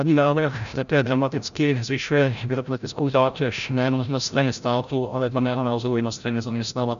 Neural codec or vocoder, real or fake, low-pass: codec, 16 kHz, 0.5 kbps, FreqCodec, larger model; fake; 7.2 kHz